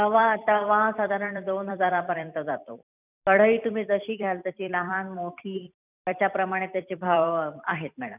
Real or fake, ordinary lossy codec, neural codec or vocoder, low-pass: fake; none; vocoder, 44.1 kHz, 128 mel bands every 256 samples, BigVGAN v2; 3.6 kHz